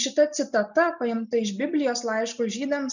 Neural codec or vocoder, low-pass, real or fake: none; 7.2 kHz; real